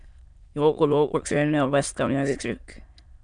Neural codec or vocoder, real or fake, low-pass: autoencoder, 22.05 kHz, a latent of 192 numbers a frame, VITS, trained on many speakers; fake; 9.9 kHz